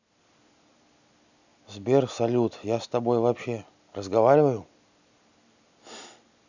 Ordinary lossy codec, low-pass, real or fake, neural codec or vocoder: none; 7.2 kHz; real; none